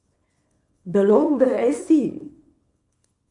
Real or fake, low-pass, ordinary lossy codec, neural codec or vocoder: fake; 10.8 kHz; MP3, 64 kbps; codec, 24 kHz, 0.9 kbps, WavTokenizer, small release